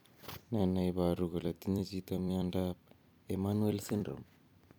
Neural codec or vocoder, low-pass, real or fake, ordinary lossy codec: vocoder, 44.1 kHz, 128 mel bands every 256 samples, BigVGAN v2; none; fake; none